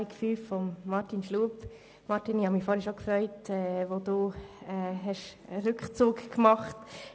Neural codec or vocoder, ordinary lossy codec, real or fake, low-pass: none; none; real; none